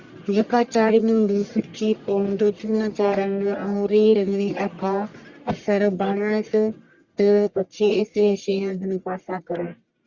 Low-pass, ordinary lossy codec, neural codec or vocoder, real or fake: 7.2 kHz; Opus, 64 kbps; codec, 44.1 kHz, 1.7 kbps, Pupu-Codec; fake